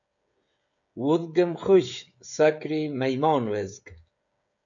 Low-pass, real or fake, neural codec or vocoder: 7.2 kHz; fake; codec, 16 kHz, 16 kbps, FreqCodec, smaller model